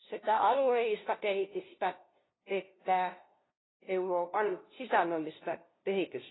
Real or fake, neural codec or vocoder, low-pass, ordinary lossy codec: fake; codec, 16 kHz, 0.5 kbps, FunCodec, trained on LibriTTS, 25 frames a second; 7.2 kHz; AAC, 16 kbps